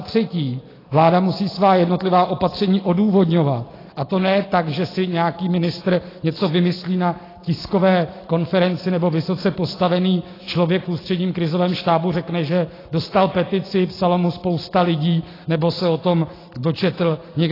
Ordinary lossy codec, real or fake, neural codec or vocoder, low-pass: AAC, 24 kbps; real; none; 5.4 kHz